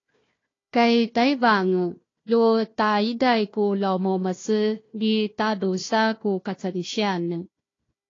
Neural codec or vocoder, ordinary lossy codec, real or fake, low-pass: codec, 16 kHz, 1 kbps, FunCodec, trained on Chinese and English, 50 frames a second; AAC, 32 kbps; fake; 7.2 kHz